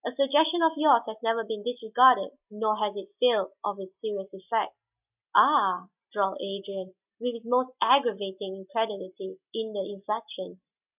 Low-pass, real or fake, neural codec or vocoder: 3.6 kHz; real; none